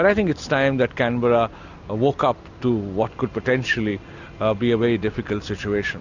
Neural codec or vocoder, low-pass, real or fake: none; 7.2 kHz; real